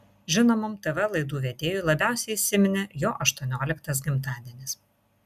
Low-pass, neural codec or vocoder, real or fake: 14.4 kHz; none; real